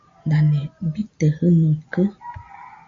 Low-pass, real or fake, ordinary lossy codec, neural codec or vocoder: 7.2 kHz; real; MP3, 48 kbps; none